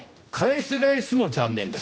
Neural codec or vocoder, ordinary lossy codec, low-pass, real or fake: codec, 16 kHz, 1 kbps, X-Codec, HuBERT features, trained on general audio; none; none; fake